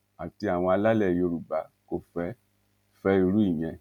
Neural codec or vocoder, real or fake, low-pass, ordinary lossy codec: none; real; 19.8 kHz; none